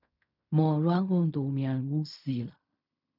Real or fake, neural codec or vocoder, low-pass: fake; codec, 16 kHz in and 24 kHz out, 0.4 kbps, LongCat-Audio-Codec, fine tuned four codebook decoder; 5.4 kHz